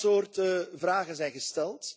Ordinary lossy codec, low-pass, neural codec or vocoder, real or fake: none; none; none; real